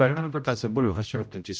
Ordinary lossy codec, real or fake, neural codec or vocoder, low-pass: none; fake; codec, 16 kHz, 0.5 kbps, X-Codec, HuBERT features, trained on general audio; none